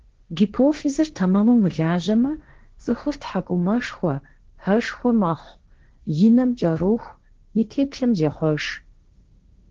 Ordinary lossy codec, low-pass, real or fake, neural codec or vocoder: Opus, 16 kbps; 7.2 kHz; fake; codec, 16 kHz, 1.1 kbps, Voila-Tokenizer